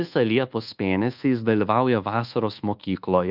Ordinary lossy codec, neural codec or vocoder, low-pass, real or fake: Opus, 32 kbps; codec, 24 kHz, 1.2 kbps, DualCodec; 5.4 kHz; fake